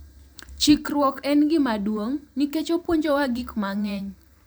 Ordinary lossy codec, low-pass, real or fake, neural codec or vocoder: none; none; fake; vocoder, 44.1 kHz, 128 mel bands every 512 samples, BigVGAN v2